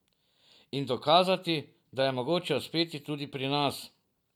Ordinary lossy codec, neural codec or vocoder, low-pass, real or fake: none; vocoder, 44.1 kHz, 128 mel bands every 256 samples, BigVGAN v2; 19.8 kHz; fake